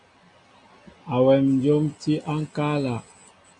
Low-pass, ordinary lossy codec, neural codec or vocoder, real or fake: 9.9 kHz; MP3, 48 kbps; none; real